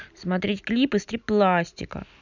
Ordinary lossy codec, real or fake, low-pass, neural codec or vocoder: none; real; 7.2 kHz; none